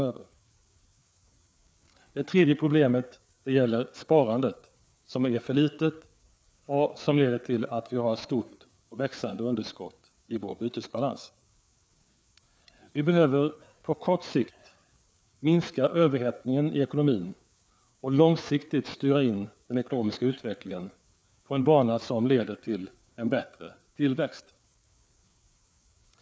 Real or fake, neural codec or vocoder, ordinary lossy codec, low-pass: fake; codec, 16 kHz, 4 kbps, FreqCodec, larger model; none; none